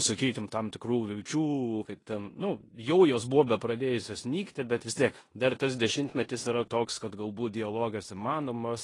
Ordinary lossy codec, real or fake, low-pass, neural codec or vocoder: AAC, 32 kbps; fake; 10.8 kHz; codec, 16 kHz in and 24 kHz out, 0.9 kbps, LongCat-Audio-Codec, four codebook decoder